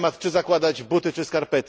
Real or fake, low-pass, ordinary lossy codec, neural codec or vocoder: real; none; none; none